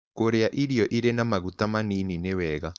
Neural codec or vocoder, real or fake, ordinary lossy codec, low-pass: codec, 16 kHz, 4.8 kbps, FACodec; fake; none; none